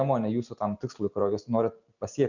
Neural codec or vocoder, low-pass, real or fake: none; 7.2 kHz; real